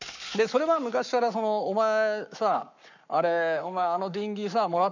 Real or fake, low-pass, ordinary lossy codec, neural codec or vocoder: fake; 7.2 kHz; none; codec, 44.1 kHz, 7.8 kbps, Pupu-Codec